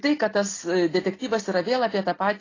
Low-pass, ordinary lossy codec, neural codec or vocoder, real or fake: 7.2 kHz; AAC, 32 kbps; none; real